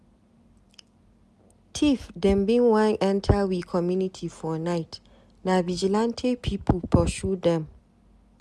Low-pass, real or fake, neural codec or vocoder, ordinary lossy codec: none; real; none; none